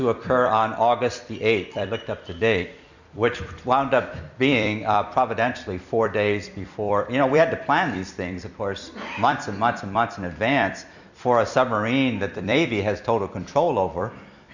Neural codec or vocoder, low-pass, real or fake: vocoder, 44.1 kHz, 128 mel bands every 256 samples, BigVGAN v2; 7.2 kHz; fake